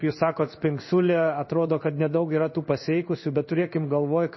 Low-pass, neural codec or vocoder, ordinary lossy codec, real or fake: 7.2 kHz; codec, 16 kHz in and 24 kHz out, 1 kbps, XY-Tokenizer; MP3, 24 kbps; fake